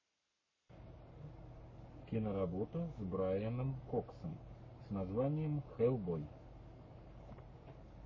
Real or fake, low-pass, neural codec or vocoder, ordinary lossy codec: fake; 7.2 kHz; codec, 44.1 kHz, 7.8 kbps, Pupu-Codec; MP3, 32 kbps